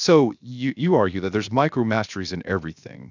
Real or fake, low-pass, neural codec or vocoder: fake; 7.2 kHz; codec, 16 kHz, 0.7 kbps, FocalCodec